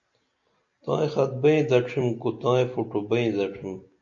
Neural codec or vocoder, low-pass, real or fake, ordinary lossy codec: none; 7.2 kHz; real; MP3, 48 kbps